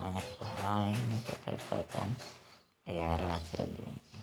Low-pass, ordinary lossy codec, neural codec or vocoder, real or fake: none; none; codec, 44.1 kHz, 3.4 kbps, Pupu-Codec; fake